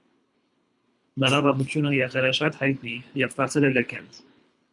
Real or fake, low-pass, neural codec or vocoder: fake; 10.8 kHz; codec, 24 kHz, 3 kbps, HILCodec